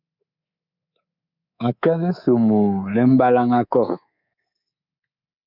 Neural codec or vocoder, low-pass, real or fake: codec, 24 kHz, 3.1 kbps, DualCodec; 5.4 kHz; fake